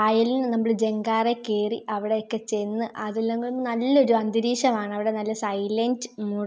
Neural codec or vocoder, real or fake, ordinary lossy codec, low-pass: none; real; none; none